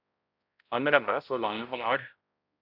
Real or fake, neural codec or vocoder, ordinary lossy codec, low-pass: fake; codec, 16 kHz, 0.5 kbps, X-Codec, HuBERT features, trained on balanced general audio; AAC, 48 kbps; 5.4 kHz